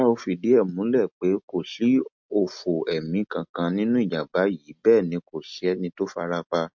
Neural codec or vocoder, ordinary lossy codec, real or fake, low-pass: none; MP3, 48 kbps; real; 7.2 kHz